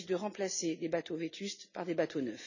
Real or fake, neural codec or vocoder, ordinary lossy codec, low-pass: real; none; none; 7.2 kHz